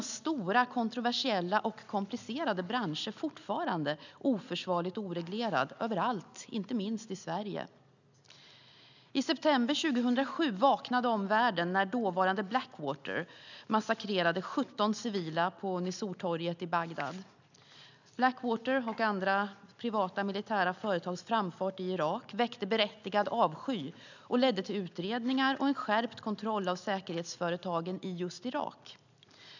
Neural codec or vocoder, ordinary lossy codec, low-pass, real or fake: none; none; 7.2 kHz; real